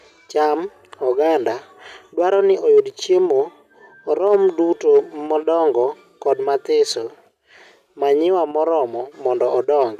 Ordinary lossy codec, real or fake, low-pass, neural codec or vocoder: none; real; 14.4 kHz; none